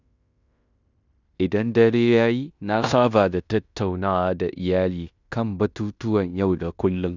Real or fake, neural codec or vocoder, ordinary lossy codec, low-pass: fake; codec, 16 kHz in and 24 kHz out, 0.9 kbps, LongCat-Audio-Codec, fine tuned four codebook decoder; none; 7.2 kHz